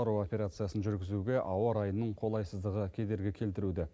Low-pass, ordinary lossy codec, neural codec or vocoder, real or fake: none; none; none; real